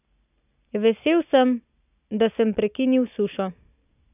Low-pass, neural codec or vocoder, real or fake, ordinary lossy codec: 3.6 kHz; none; real; none